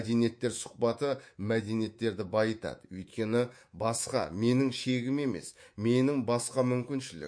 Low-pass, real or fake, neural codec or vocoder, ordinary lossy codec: 9.9 kHz; fake; codec, 24 kHz, 3.1 kbps, DualCodec; MP3, 48 kbps